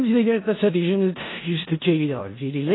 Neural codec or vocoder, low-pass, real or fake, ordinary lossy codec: codec, 16 kHz in and 24 kHz out, 0.4 kbps, LongCat-Audio-Codec, four codebook decoder; 7.2 kHz; fake; AAC, 16 kbps